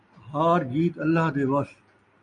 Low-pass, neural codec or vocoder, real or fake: 9.9 kHz; none; real